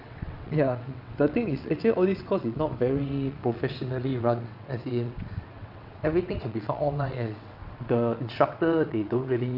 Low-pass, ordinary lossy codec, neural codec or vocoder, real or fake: 5.4 kHz; none; vocoder, 22.05 kHz, 80 mel bands, WaveNeXt; fake